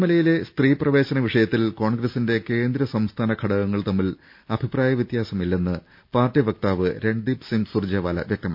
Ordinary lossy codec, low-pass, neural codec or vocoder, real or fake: MP3, 48 kbps; 5.4 kHz; none; real